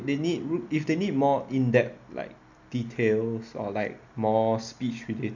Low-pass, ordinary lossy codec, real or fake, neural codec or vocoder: 7.2 kHz; none; real; none